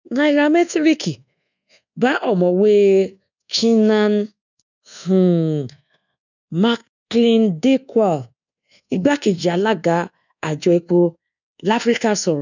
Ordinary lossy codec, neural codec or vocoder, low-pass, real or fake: none; codec, 24 kHz, 1.2 kbps, DualCodec; 7.2 kHz; fake